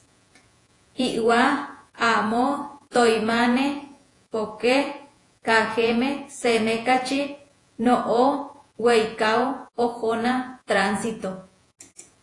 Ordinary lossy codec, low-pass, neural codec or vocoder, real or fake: AAC, 48 kbps; 10.8 kHz; vocoder, 48 kHz, 128 mel bands, Vocos; fake